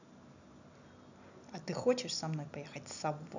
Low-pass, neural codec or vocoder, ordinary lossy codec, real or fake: 7.2 kHz; none; none; real